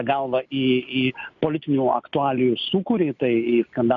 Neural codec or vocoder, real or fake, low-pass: none; real; 7.2 kHz